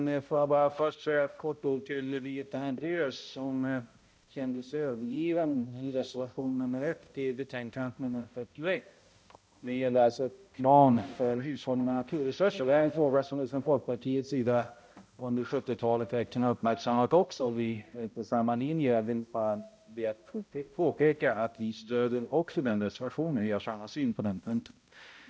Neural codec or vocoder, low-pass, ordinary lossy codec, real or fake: codec, 16 kHz, 0.5 kbps, X-Codec, HuBERT features, trained on balanced general audio; none; none; fake